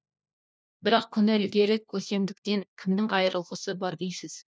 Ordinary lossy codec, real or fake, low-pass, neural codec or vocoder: none; fake; none; codec, 16 kHz, 1 kbps, FunCodec, trained on LibriTTS, 50 frames a second